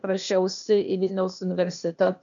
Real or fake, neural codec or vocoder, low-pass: fake; codec, 16 kHz, 0.8 kbps, ZipCodec; 7.2 kHz